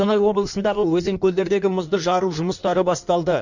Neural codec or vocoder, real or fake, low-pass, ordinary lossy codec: codec, 16 kHz in and 24 kHz out, 1.1 kbps, FireRedTTS-2 codec; fake; 7.2 kHz; none